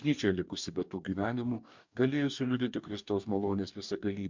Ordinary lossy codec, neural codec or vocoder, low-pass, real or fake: MP3, 64 kbps; codec, 44.1 kHz, 2.6 kbps, DAC; 7.2 kHz; fake